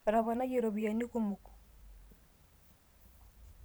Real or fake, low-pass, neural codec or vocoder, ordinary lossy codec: fake; none; vocoder, 44.1 kHz, 128 mel bands every 512 samples, BigVGAN v2; none